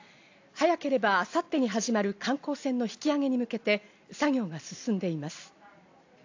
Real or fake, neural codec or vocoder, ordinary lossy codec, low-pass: real; none; AAC, 48 kbps; 7.2 kHz